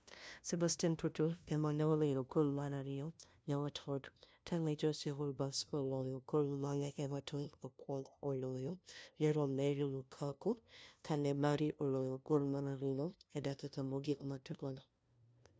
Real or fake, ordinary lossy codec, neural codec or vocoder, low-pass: fake; none; codec, 16 kHz, 0.5 kbps, FunCodec, trained on LibriTTS, 25 frames a second; none